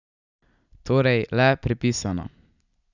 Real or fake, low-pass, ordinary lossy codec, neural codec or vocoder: real; 7.2 kHz; none; none